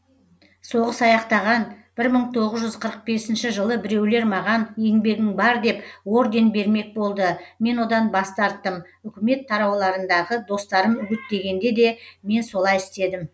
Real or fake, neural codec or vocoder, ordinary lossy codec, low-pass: real; none; none; none